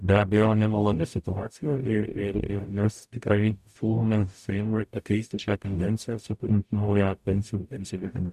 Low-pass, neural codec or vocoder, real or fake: 14.4 kHz; codec, 44.1 kHz, 0.9 kbps, DAC; fake